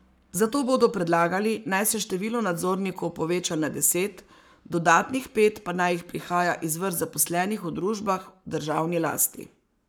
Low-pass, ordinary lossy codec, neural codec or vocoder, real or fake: none; none; codec, 44.1 kHz, 7.8 kbps, Pupu-Codec; fake